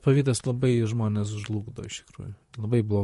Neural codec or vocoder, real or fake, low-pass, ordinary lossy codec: vocoder, 44.1 kHz, 128 mel bands, Pupu-Vocoder; fake; 14.4 kHz; MP3, 48 kbps